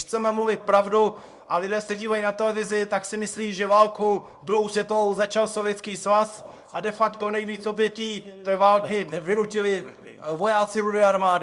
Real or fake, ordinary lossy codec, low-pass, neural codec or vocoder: fake; Opus, 64 kbps; 10.8 kHz; codec, 24 kHz, 0.9 kbps, WavTokenizer, small release